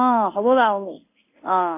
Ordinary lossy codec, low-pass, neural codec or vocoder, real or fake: none; 3.6 kHz; codec, 16 kHz in and 24 kHz out, 1 kbps, XY-Tokenizer; fake